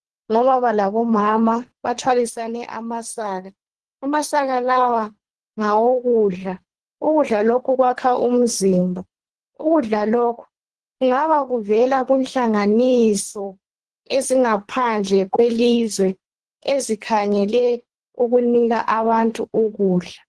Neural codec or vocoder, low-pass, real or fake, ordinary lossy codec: codec, 24 kHz, 3 kbps, HILCodec; 10.8 kHz; fake; Opus, 16 kbps